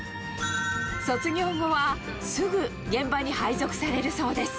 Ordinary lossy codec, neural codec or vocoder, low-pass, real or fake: none; none; none; real